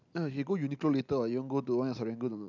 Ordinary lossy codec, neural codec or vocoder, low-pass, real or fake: none; none; 7.2 kHz; real